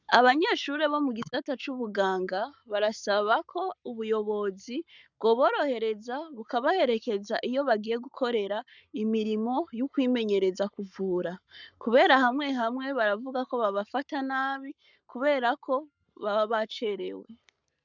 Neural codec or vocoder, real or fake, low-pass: codec, 44.1 kHz, 7.8 kbps, Pupu-Codec; fake; 7.2 kHz